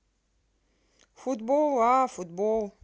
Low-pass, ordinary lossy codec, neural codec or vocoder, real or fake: none; none; none; real